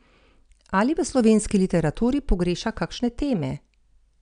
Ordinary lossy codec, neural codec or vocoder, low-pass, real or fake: none; none; 9.9 kHz; real